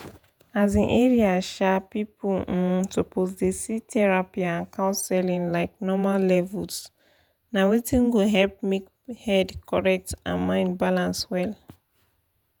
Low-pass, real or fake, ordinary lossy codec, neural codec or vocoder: none; fake; none; vocoder, 48 kHz, 128 mel bands, Vocos